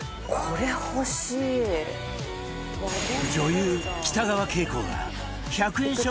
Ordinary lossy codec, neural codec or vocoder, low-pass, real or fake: none; none; none; real